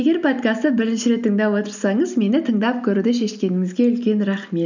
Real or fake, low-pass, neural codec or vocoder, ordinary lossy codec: real; 7.2 kHz; none; none